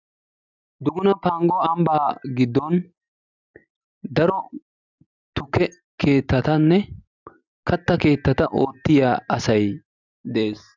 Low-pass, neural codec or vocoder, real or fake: 7.2 kHz; none; real